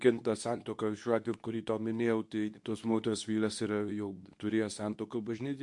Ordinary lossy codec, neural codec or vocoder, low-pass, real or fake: MP3, 64 kbps; codec, 24 kHz, 0.9 kbps, WavTokenizer, medium speech release version 2; 10.8 kHz; fake